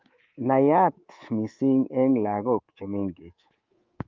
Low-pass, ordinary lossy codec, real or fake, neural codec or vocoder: 7.2 kHz; Opus, 32 kbps; fake; codec, 24 kHz, 3.1 kbps, DualCodec